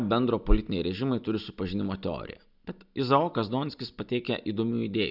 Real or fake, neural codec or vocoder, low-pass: real; none; 5.4 kHz